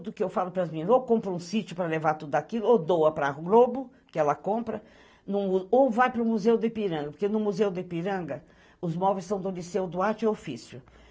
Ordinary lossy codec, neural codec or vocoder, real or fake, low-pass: none; none; real; none